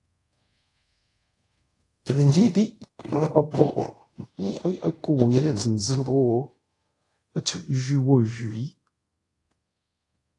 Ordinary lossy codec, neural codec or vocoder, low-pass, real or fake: AAC, 48 kbps; codec, 24 kHz, 0.5 kbps, DualCodec; 10.8 kHz; fake